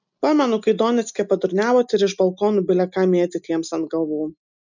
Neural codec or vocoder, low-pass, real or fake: none; 7.2 kHz; real